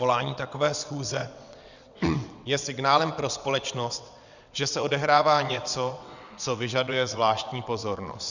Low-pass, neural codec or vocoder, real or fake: 7.2 kHz; vocoder, 22.05 kHz, 80 mel bands, WaveNeXt; fake